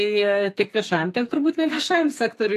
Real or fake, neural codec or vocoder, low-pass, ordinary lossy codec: fake; codec, 32 kHz, 1.9 kbps, SNAC; 14.4 kHz; AAC, 64 kbps